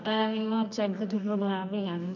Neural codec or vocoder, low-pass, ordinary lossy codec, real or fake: codec, 24 kHz, 0.9 kbps, WavTokenizer, medium music audio release; 7.2 kHz; none; fake